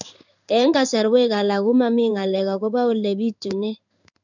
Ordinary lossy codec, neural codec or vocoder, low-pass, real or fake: none; codec, 16 kHz in and 24 kHz out, 1 kbps, XY-Tokenizer; 7.2 kHz; fake